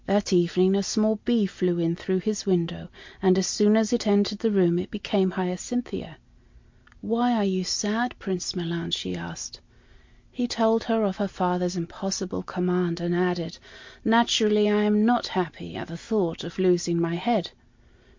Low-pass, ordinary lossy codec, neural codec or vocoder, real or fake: 7.2 kHz; MP3, 48 kbps; none; real